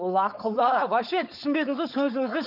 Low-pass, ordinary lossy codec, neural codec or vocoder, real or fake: 5.4 kHz; none; codec, 16 kHz, 4.8 kbps, FACodec; fake